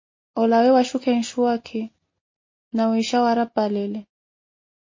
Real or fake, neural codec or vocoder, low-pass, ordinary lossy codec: real; none; 7.2 kHz; MP3, 32 kbps